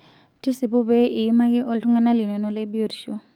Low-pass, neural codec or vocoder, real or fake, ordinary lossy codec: 19.8 kHz; codec, 44.1 kHz, 7.8 kbps, DAC; fake; none